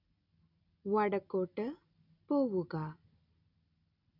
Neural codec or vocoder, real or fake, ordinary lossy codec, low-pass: none; real; none; 5.4 kHz